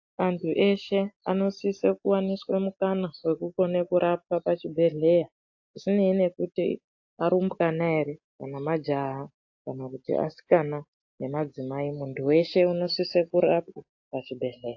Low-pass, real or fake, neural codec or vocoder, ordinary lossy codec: 7.2 kHz; real; none; AAC, 48 kbps